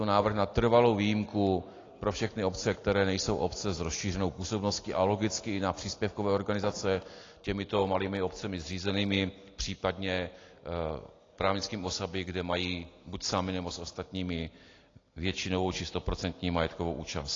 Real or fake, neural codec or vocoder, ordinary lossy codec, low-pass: real; none; AAC, 32 kbps; 7.2 kHz